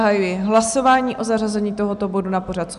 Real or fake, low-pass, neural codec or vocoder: real; 10.8 kHz; none